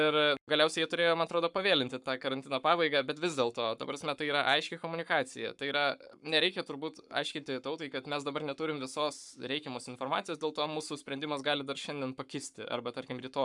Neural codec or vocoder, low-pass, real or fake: codec, 44.1 kHz, 7.8 kbps, Pupu-Codec; 10.8 kHz; fake